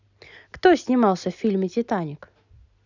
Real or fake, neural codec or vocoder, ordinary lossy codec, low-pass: real; none; none; 7.2 kHz